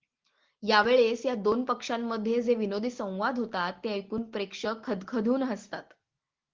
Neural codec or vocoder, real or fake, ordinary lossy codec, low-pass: none; real; Opus, 16 kbps; 7.2 kHz